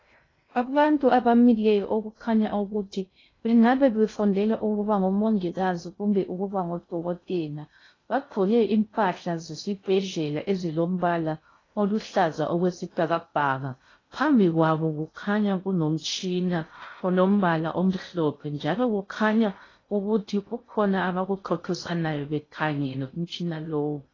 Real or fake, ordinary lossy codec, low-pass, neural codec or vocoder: fake; AAC, 32 kbps; 7.2 kHz; codec, 16 kHz in and 24 kHz out, 0.6 kbps, FocalCodec, streaming, 2048 codes